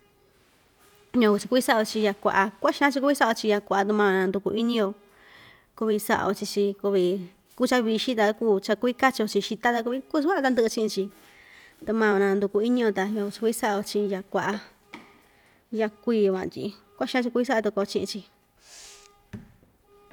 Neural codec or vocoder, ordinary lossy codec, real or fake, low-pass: vocoder, 44.1 kHz, 128 mel bands every 512 samples, BigVGAN v2; none; fake; 19.8 kHz